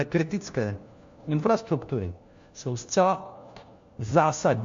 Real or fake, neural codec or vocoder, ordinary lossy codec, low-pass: fake; codec, 16 kHz, 1 kbps, FunCodec, trained on LibriTTS, 50 frames a second; MP3, 48 kbps; 7.2 kHz